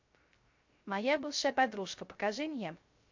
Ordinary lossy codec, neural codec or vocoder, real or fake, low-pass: MP3, 48 kbps; codec, 16 kHz, 0.3 kbps, FocalCodec; fake; 7.2 kHz